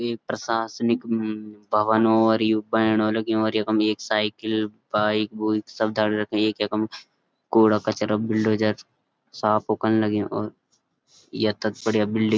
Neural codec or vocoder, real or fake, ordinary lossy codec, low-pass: none; real; none; none